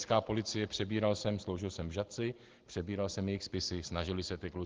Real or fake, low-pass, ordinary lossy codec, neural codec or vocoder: real; 7.2 kHz; Opus, 16 kbps; none